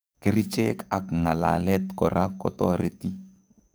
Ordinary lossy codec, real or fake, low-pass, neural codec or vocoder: none; fake; none; codec, 44.1 kHz, 7.8 kbps, DAC